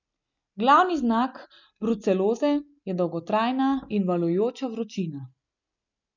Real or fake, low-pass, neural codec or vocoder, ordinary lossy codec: real; 7.2 kHz; none; none